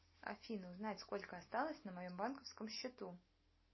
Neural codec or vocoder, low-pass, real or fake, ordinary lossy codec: none; 7.2 kHz; real; MP3, 24 kbps